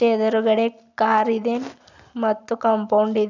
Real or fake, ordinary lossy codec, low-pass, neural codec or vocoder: real; none; 7.2 kHz; none